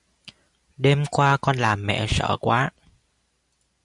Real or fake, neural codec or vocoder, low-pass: real; none; 10.8 kHz